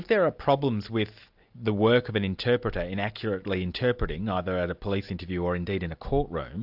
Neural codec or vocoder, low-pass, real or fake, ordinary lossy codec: none; 5.4 kHz; real; AAC, 48 kbps